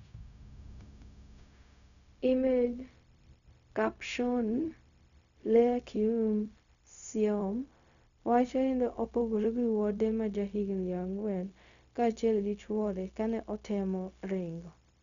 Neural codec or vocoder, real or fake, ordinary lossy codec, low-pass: codec, 16 kHz, 0.4 kbps, LongCat-Audio-Codec; fake; none; 7.2 kHz